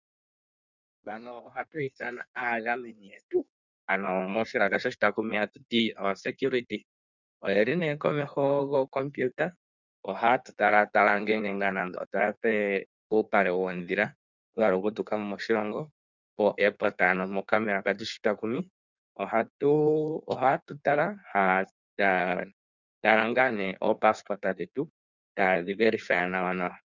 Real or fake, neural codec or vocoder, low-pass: fake; codec, 16 kHz in and 24 kHz out, 1.1 kbps, FireRedTTS-2 codec; 7.2 kHz